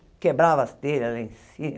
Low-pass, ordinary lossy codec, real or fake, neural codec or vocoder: none; none; real; none